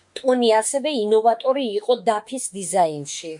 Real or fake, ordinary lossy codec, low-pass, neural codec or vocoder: fake; MP3, 64 kbps; 10.8 kHz; autoencoder, 48 kHz, 32 numbers a frame, DAC-VAE, trained on Japanese speech